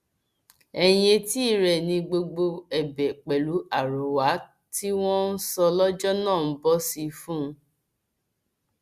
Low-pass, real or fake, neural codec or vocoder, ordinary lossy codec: 14.4 kHz; real; none; Opus, 64 kbps